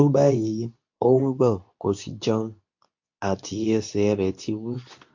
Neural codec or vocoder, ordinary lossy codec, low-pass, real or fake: codec, 24 kHz, 0.9 kbps, WavTokenizer, medium speech release version 2; AAC, 48 kbps; 7.2 kHz; fake